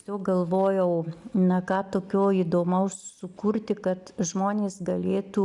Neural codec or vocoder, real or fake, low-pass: none; real; 10.8 kHz